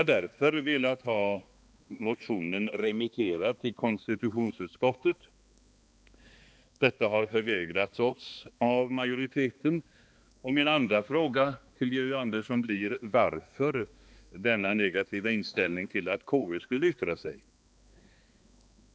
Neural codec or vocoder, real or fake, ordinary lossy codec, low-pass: codec, 16 kHz, 2 kbps, X-Codec, HuBERT features, trained on balanced general audio; fake; none; none